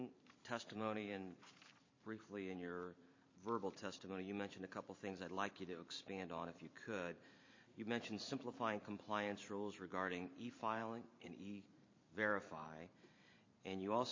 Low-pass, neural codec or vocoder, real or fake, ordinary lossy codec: 7.2 kHz; vocoder, 44.1 kHz, 128 mel bands every 512 samples, BigVGAN v2; fake; MP3, 32 kbps